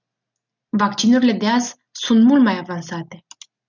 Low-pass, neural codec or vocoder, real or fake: 7.2 kHz; none; real